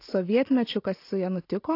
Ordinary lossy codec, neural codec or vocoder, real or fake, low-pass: AAC, 32 kbps; none; real; 5.4 kHz